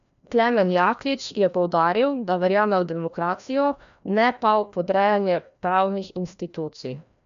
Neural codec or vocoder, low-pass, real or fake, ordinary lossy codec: codec, 16 kHz, 1 kbps, FreqCodec, larger model; 7.2 kHz; fake; AAC, 96 kbps